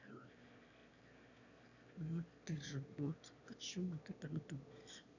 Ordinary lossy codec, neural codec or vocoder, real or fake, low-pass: none; autoencoder, 22.05 kHz, a latent of 192 numbers a frame, VITS, trained on one speaker; fake; 7.2 kHz